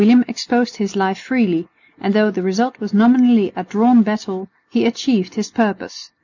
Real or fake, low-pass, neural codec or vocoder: real; 7.2 kHz; none